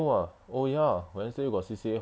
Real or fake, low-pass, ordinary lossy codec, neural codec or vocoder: real; none; none; none